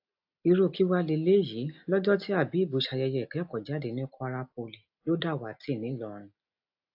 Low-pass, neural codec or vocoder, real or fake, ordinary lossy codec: 5.4 kHz; none; real; AAC, 48 kbps